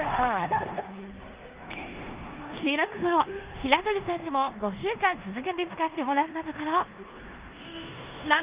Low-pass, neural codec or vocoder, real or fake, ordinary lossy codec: 3.6 kHz; codec, 16 kHz in and 24 kHz out, 0.9 kbps, LongCat-Audio-Codec, four codebook decoder; fake; Opus, 32 kbps